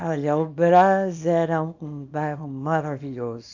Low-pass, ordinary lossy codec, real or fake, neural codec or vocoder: 7.2 kHz; AAC, 48 kbps; fake; codec, 24 kHz, 0.9 kbps, WavTokenizer, small release